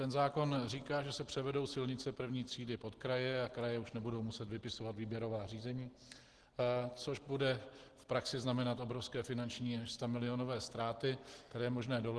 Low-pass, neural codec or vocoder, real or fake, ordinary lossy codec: 10.8 kHz; none; real; Opus, 16 kbps